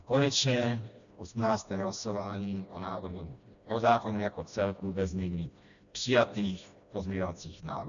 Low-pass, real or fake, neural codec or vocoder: 7.2 kHz; fake; codec, 16 kHz, 1 kbps, FreqCodec, smaller model